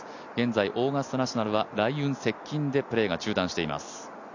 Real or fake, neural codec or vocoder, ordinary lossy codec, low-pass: real; none; none; 7.2 kHz